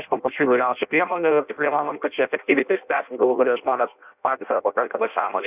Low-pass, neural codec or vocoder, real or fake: 3.6 kHz; codec, 16 kHz in and 24 kHz out, 0.6 kbps, FireRedTTS-2 codec; fake